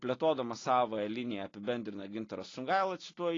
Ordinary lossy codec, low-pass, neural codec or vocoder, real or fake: AAC, 32 kbps; 7.2 kHz; none; real